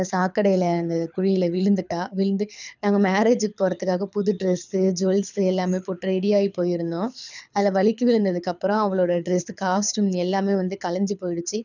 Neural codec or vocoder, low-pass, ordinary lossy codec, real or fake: codec, 24 kHz, 6 kbps, HILCodec; 7.2 kHz; none; fake